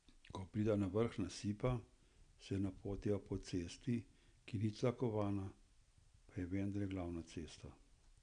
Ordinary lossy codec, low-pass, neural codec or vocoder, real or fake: none; 9.9 kHz; none; real